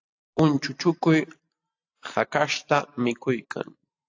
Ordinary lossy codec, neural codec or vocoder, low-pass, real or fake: AAC, 48 kbps; none; 7.2 kHz; real